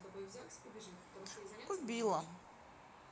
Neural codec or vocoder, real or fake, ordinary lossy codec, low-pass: none; real; none; none